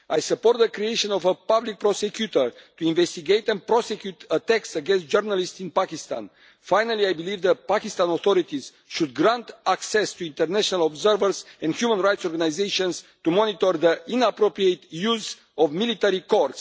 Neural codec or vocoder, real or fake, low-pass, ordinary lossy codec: none; real; none; none